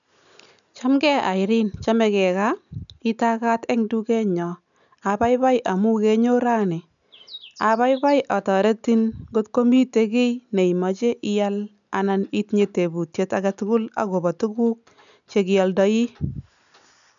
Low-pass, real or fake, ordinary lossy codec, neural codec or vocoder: 7.2 kHz; real; none; none